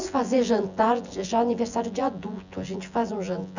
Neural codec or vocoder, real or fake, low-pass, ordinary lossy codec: vocoder, 24 kHz, 100 mel bands, Vocos; fake; 7.2 kHz; none